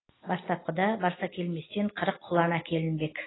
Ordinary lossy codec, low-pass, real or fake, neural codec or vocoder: AAC, 16 kbps; 7.2 kHz; real; none